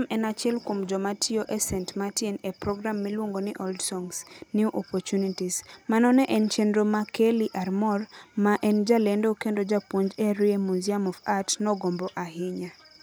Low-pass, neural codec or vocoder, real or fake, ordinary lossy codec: none; none; real; none